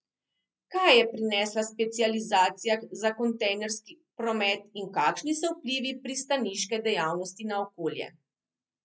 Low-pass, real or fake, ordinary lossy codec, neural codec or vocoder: none; real; none; none